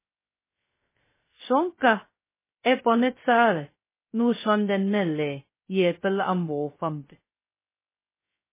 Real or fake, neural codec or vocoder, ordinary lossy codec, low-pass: fake; codec, 16 kHz, 0.2 kbps, FocalCodec; MP3, 16 kbps; 3.6 kHz